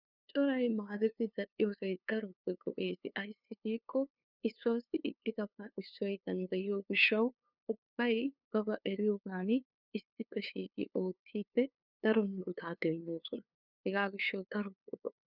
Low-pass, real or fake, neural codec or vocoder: 5.4 kHz; fake; codec, 16 kHz, 2 kbps, FunCodec, trained on LibriTTS, 25 frames a second